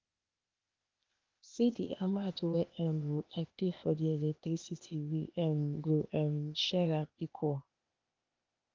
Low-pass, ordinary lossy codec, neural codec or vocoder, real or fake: 7.2 kHz; Opus, 24 kbps; codec, 16 kHz, 0.8 kbps, ZipCodec; fake